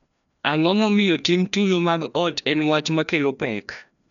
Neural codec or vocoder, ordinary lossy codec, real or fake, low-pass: codec, 16 kHz, 1 kbps, FreqCodec, larger model; none; fake; 7.2 kHz